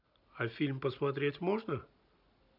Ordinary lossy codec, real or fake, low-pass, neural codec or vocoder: none; real; 5.4 kHz; none